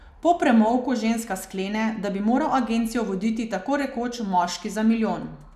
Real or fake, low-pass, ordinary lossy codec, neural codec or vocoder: real; 14.4 kHz; none; none